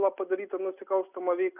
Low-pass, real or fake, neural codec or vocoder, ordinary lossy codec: 3.6 kHz; real; none; Opus, 64 kbps